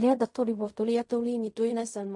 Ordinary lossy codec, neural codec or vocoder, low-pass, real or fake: MP3, 48 kbps; codec, 16 kHz in and 24 kHz out, 0.4 kbps, LongCat-Audio-Codec, fine tuned four codebook decoder; 10.8 kHz; fake